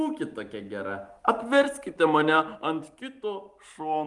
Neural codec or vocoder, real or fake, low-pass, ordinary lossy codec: none; real; 10.8 kHz; Opus, 24 kbps